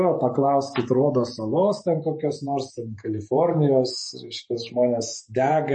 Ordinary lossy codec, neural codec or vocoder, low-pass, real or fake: MP3, 32 kbps; vocoder, 48 kHz, 128 mel bands, Vocos; 10.8 kHz; fake